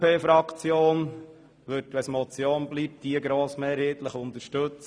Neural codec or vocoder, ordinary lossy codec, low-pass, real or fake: none; none; none; real